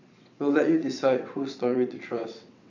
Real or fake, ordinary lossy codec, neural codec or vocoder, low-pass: fake; none; vocoder, 22.05 kHz, 80 mel bands, WaveNeXt; 7.2 kHz